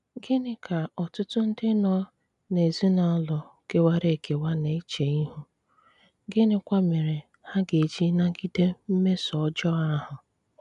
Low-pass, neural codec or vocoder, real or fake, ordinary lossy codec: 10.8 kHz; none; real; none